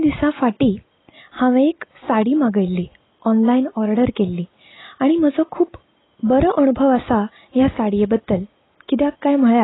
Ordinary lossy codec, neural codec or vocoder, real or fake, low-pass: AAC, 16 kbps; none; real; 7.2 kHz